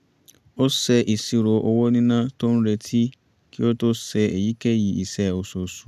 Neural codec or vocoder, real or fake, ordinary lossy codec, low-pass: none; real; none; 14.4 kHz